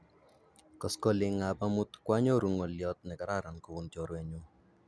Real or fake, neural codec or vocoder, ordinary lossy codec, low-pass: real; none; none; none